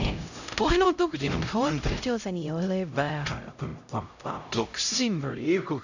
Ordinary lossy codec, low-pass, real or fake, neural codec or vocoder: none; 7.2 kHz; fake; codec, 16 kHz, 0.5 kbps, X-Codec, HuBERT features, trained on LibriSpeech